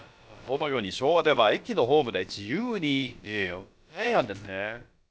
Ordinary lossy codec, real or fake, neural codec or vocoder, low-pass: none; fake; codec, 16 kHz, about 1 kbps, DyCAST, with the encoder's durations; none